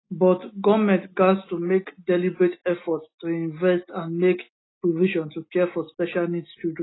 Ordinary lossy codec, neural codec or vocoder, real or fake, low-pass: AAC, 16 kbps; none; real; 7.2 kHz